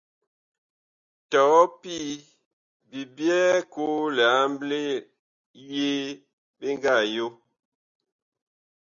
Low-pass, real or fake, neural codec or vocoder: 7.2 kHz; real; none